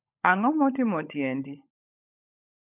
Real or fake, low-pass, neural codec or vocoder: fake; 3.6 kHz; codec, 16 kHz, 16 kbps, FunCodec, trained on LibriTTS, 50 frames a second